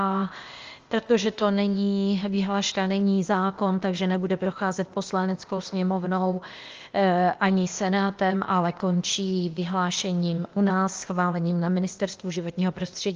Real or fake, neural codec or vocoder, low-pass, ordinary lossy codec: fake; codec, 16 kHz, 0.8 kbps, ZipCodec; 7.2 kHz; Opus, 32 kbps